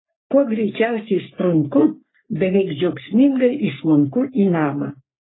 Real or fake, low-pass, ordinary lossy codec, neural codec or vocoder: fake; 7.2 kHz; AAC, 16 kbps; codec, 44.1 kHz, 3.4 kbps, Pupu-Codec